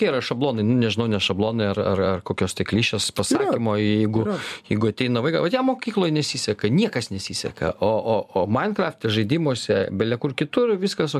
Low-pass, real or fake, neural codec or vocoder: 14.4 kHz; real; none